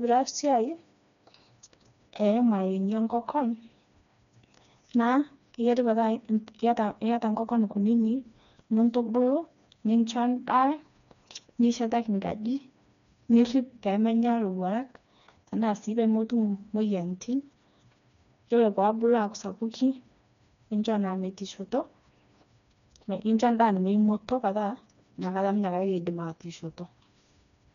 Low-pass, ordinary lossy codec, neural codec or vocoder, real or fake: 7.2 kHz; none; codec, 16 kHz, 2 kbps, FreqCodec, smaller model; fake